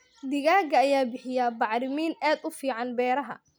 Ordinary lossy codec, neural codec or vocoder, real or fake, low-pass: none; none; real; none